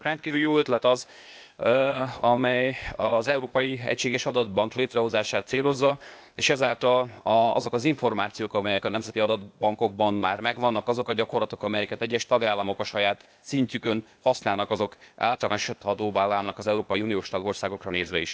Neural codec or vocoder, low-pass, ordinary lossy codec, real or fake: codec, 16 kHz, 0.8 kbps, ZipCodec; none; none; fake